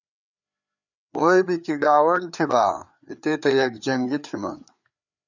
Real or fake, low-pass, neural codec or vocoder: fake; 7.2 kHz; codec, 16 kHz, 4 kbps, FreqCodec, larger model